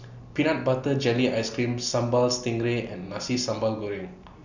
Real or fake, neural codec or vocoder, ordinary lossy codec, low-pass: real; none; Opus, 64 kbps; 7.2 kHz